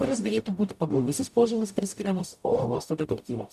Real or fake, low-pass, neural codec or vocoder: fake; 14.4 kHz; codec, 44.1 kHz, 0.9 kbps, DAC